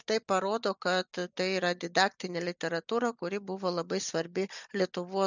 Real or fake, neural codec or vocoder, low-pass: real; none; 7.2 kHz